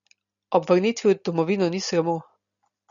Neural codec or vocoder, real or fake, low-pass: none; real; 7.2 kHz